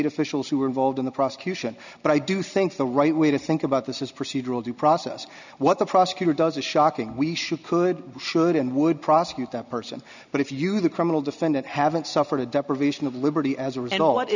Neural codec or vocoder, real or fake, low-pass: none; real; 7.2 kHz